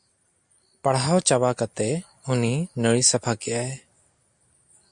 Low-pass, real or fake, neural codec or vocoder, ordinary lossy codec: 9.9 kHz; real; none; MP3, 64 kbps